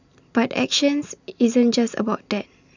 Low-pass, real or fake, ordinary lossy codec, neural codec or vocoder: 7.2 kHz; real; none; none